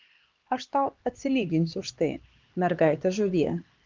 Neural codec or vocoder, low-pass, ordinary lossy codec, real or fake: codec, 16 kHz, 2 kbps, X-Codec, HuBERT features, trained on LibriSpeech; 7.2 kHz; Opus, 24 kbps; fake